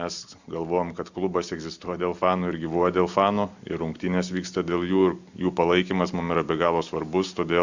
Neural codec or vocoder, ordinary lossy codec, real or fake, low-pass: none; Opus, 64 kbps; real; 7.2 kHz